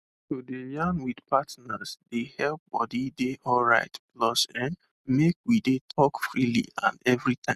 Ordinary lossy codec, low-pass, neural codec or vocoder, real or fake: none; 14.4 kHz; none; real